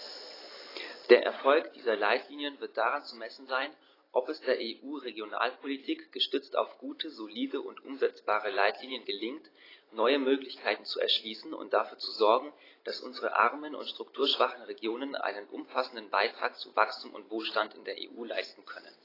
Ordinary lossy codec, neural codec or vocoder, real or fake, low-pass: AAC, 24 kbps; none; real; 5.4 kHz